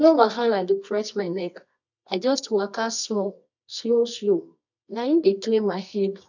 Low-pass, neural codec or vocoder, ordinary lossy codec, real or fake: 7.2 kHz; codec, 24 kHz, 0.9 kbps, WavTokenizer, medium music audio release; none; fake